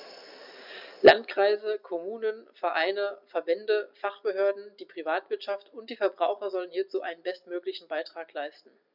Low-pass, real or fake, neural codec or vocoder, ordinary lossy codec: 5.4 kHz; real; none; none